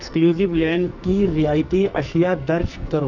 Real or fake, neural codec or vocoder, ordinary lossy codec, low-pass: fake; codec, 44.1 kHz, 2.6 kbps, SNAC; none; 7.2 kHz